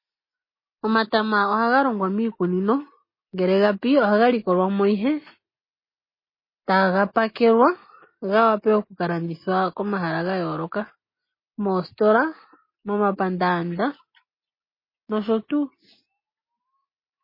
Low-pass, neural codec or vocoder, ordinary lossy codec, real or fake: 5.4 kHz; none; MP3, 24 kbps; real